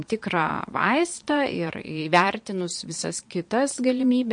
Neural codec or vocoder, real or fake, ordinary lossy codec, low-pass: vocoder, 22.05 kHz, 80 mel bands, Vocos; fake; MP3, 48 kbps; 9.9 kHz